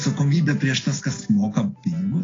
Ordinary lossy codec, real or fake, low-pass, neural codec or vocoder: AAC, 32 kbps; real; 7.2 kHz; none